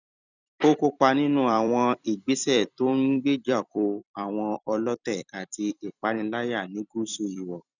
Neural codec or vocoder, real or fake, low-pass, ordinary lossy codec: vocoder, 44.1 kHz, 128 mel bands every 256 samples, BigVGAN v2; fake; 7.2 kHz; none